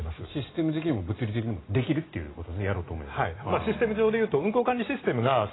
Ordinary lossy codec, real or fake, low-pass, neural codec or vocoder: AAC, 16 kbps; real; 7.2 kHz; none